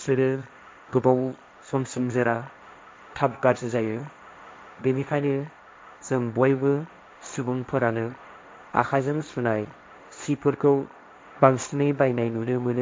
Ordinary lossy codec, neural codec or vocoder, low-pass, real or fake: none; codec, 16 kHz, 1.1 kbps, Voila-Tokenizer; none; fake